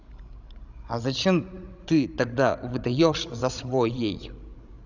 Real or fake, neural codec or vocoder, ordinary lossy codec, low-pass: fake; codec, 16 kHz, 16 kbps, FreqCodec, larger model; none; 7.2 kHz